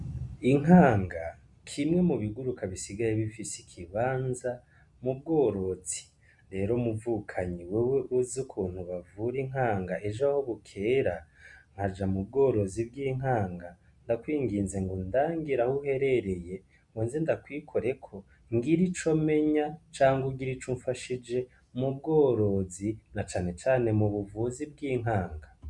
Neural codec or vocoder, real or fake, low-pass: none; real; 10.8 kHz